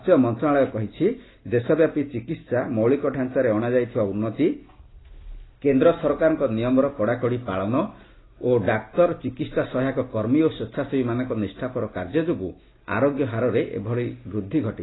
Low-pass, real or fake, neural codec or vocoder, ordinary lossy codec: 7.2 kHz; real; none; AAC, 16 kbps